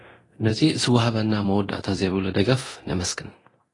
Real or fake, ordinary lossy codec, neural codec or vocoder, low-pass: fake; AAC, 32 kbps; codec, 24 kHz, 0.9 kbps, DualCodec; 10.8 kHz